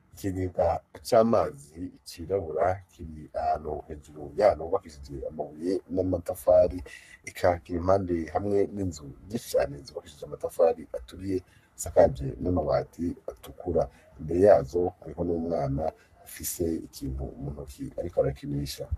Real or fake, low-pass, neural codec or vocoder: fake; 14.4 kHz; codec, 44.1 kHz, 3.4 kbps, Pupu-Codec